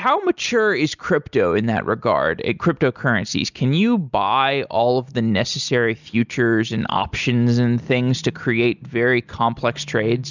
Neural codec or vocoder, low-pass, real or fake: none; 7.2 kHz; real